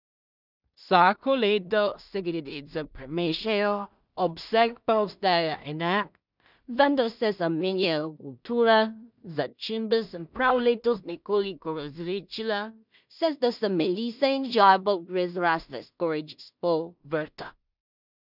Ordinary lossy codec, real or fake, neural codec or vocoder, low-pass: none; fake; codec, 16 kHz in and 24 kHz out, 0.4 kbps, LongCat-Audio-Codec, two codebook decoder; 5.4 kHz